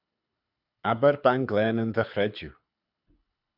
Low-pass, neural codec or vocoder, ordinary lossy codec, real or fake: 5.4 kHz; codec, 24 kHz, 6 kbps, HILCodec; AAC, 48 kbps; fake